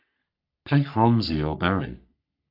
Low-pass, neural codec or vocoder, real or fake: 5.4 kHz; codec, 44.1 kHz, 3.4 kbps, Pupu-Codec; fake